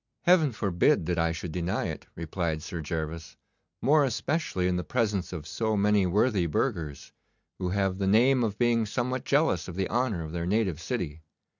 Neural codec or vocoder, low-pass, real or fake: none; 7.2 kHz; real